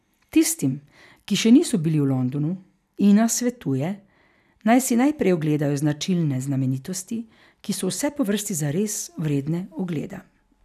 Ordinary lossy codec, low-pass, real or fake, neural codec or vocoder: none; 14.4 kHz; real; none